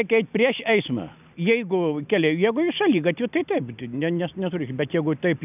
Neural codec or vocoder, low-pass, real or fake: none; 3.6 kHz; real